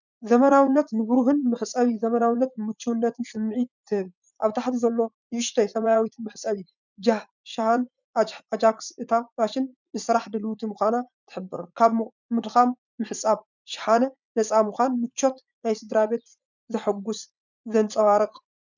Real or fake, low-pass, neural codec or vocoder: fake; 7.2 kHz; vocoder, 44.1 kHz, 80 mel bands, Vocos